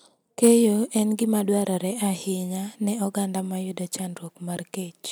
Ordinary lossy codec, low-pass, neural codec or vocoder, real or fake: none; none; none; real